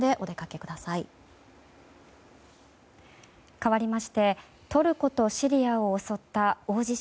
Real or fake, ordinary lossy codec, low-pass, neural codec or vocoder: real; none; none; none